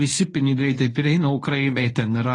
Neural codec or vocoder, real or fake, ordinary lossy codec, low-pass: codec, 24 kHz, 0.9 kbps, WavTokenizer, medium speech release version 1; fake; AAC, 32 kbps; 10.8 kHz